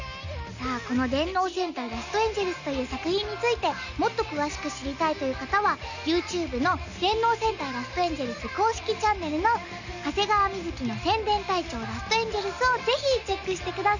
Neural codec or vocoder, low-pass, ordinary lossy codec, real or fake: none; 7.2 kHz; none; real